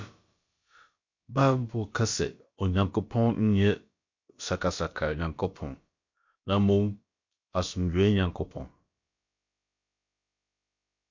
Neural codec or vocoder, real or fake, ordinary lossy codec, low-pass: codec, 16 kHz, about 1 kbps, DyCAST, with the encoder's durations; fake; MP3, 48 kbps; 7.2 kHz